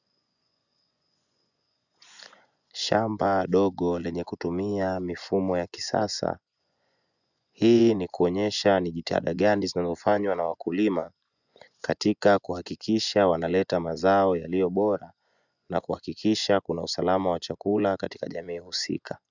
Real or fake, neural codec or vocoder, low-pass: fake; vocoder, 24 kHz, 100 mel bands, Vocos; 7.2 kHz